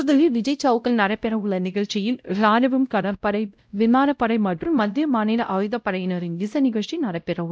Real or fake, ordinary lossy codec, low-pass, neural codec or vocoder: fake; none; none; codec, 16 kHz, 0.5 kbps, X-Codec, WavLM features, trained on Multilingual LibriSpeech